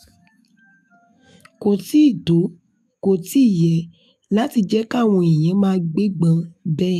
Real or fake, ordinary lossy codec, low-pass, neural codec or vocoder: fake; AAC, 96 kbps; 14.4 kHz; autoencoder, 48 kHz, 128 numbers a frame, DAC-VAE, trained on Japanese speech